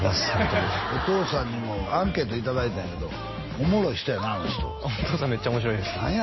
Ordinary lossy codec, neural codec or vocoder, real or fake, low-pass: MP3, 24 kbps; none; real; 7.2 kHz